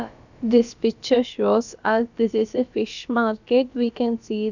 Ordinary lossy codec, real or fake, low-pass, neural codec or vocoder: none; fake; 7.2 kHz; codec, 16 kHz, about 1 kbps, DyCAST, with the encoder's durations